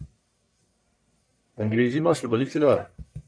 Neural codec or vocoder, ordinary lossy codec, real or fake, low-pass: codec, 44.1 kHz, 1.7 kbps, Pupu-Codec; AAC, 64 kbps; fake; 9.9 kHz